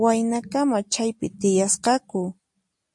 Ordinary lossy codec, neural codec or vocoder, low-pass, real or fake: MP3, 48 kbps; none; 10.8 kHz; real